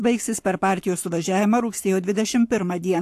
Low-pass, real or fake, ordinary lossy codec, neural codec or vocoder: 14.4 kHz; fake; AAC, 64 kbps; codec, 44.1 kHz, 7.8 kbps, Pupu-Codec